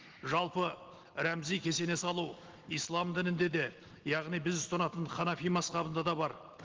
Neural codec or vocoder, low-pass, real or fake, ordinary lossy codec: none; 7.2 kHz; real; Opus, 16 kbps